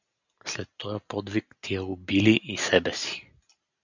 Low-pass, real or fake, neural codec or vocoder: 7.2 kHz; real; none